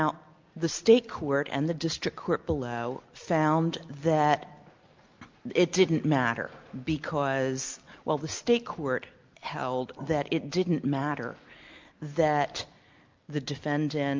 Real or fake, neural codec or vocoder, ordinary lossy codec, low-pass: real; none; Opus, 24 kbps; 7.2 kHz